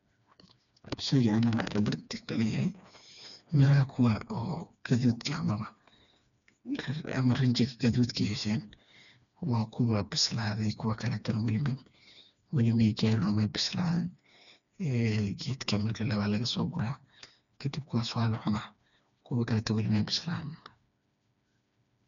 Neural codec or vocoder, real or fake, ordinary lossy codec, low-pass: codec, 16 kHz, 2 kbps, FreqCodec, smaller model; fake; none; 7.2 kHz